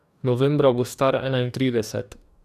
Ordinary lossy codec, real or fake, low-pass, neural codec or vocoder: none; fake; 14.4 kHz; codec, 44.1 kHz, 2.6 kbps, DAC